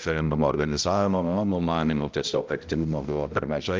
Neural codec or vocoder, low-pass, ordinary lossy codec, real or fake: codec, 16 kHz, 0.5 kbps, X-Codec, HuBERT features, trained on balanced general audio; 7.2 kHz; Opus, 24 kbps; fake